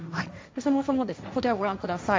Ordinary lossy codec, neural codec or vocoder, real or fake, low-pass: none; codec, 16 kHz, 1.1 kbps, Voila-Tokenizer; fake; none